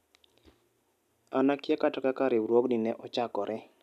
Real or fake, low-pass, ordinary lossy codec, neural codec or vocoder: real; 14.4 kHz; none; none